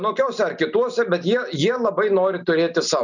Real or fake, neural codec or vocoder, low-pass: real; none; 7.2 kHz